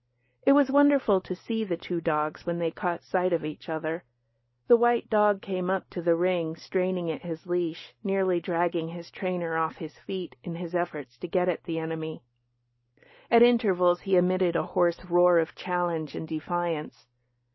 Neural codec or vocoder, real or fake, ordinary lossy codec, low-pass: none; real; MP3, 24 kbps; 7.2 kHz